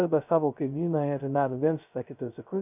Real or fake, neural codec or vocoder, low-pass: fake; codec, 16 kHz, 0.2 kbps, FocalCodec; 3.6 kHz